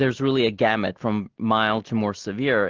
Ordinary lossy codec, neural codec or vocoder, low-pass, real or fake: Opus, 16 kbps; none; 7.2 kHz; real